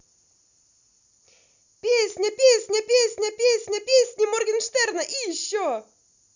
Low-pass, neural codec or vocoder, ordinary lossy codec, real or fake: 7.2 kHz; none; none; real